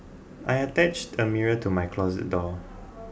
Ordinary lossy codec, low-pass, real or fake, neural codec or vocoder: none; none; real; none